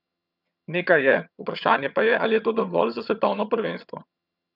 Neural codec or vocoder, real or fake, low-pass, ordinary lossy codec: vocoder, 22.05 kHz, 80 mel bands, HiFi-GAN; fake; 5.4 kHz; none